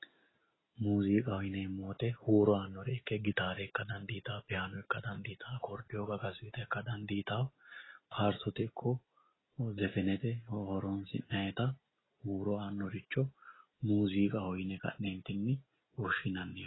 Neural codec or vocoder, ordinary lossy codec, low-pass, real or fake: none; AAC, 16 kbps; 7.2 kHz; real